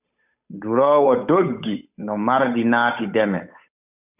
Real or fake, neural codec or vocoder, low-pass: fake; codec, 16 kHz, 8 kbps, FunCodec, trained on Chinese and English, 25 frames a second; 3.6 kHz